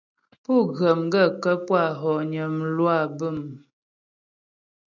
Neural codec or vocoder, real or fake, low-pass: none; real; 7.2 kHz